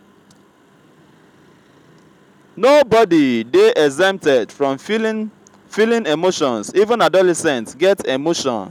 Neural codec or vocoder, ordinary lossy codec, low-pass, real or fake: none; none; 19.8 kHz; real